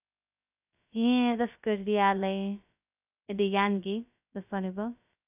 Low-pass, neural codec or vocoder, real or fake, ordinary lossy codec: 3.6 kHz; codec, 16 kHz, 0.2 kbps, FocalCodec; fake; none